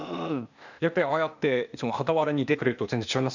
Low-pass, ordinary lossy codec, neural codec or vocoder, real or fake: 7.2 kHz; none; codec, 16 kHz, 0.8 kbps, ZipCodec; fake